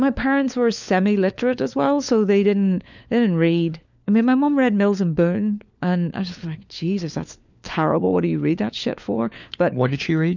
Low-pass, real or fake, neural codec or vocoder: 7.2 kHz; fake; codec, 16 kHz, 4 kbps, FunCodec, trained on LibriTTS, 50 frames a second